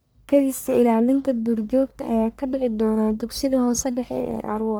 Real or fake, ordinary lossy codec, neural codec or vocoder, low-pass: fake; none; codec, 44.1 kHz, 1.7 kbps, Pupu-Codec; none